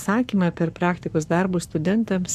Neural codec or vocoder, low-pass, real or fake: codec, 44.1 kHz, 7.8 kbps, Pupu-Codec; 14.4 kHz; fake